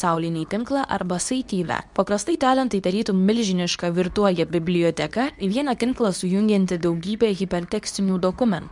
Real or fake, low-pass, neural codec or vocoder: fake; 10.8 kHz; codec, 24 kHz, 0.9 kbps, WavTokenizer, medium speech release version 2